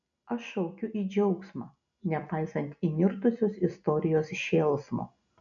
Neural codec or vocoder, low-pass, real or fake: none; 7.2 kHz; real